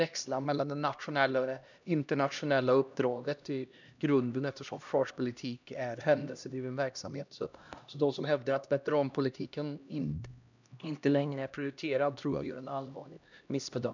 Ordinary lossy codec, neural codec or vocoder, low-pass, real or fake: none; codec, 16 kHz, 1 kbps, X-Codec, HuBERT features, trained on LibriSpeech; 7.2 kHz; fake